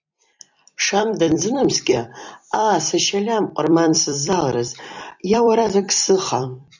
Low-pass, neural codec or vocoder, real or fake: 7.2 kHz; vocoder, 44.1 kHz, 128 mel bands every 256 samples, BigVGAN v2; fake